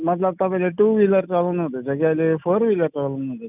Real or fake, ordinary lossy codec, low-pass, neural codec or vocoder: real; none; 3.6 kHz; none